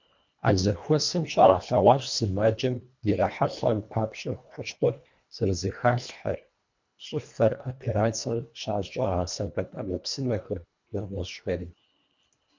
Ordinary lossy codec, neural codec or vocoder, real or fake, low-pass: MP3, 64 kbps; codec, 24 kHz, 1.5 kbps, HILCodec; fake; 7.2 kHz